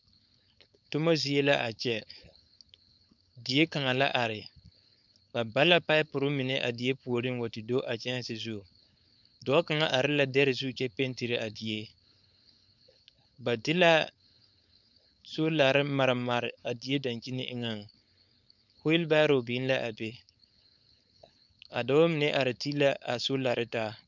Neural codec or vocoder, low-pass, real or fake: codec, 16 kHz, 4.8 kbps, FACodec; 7.2 kHz; fake